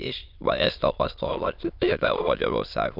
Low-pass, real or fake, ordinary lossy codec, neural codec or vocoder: 5.4 kHz; fake; none; autoencoder, 22.05 kHz, a latent of 192 numbers a frame, VITS, trained on many speakers